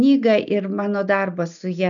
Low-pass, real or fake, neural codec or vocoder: 7.2 kHz; real; none